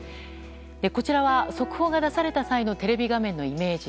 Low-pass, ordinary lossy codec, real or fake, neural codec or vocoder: none; none; real; none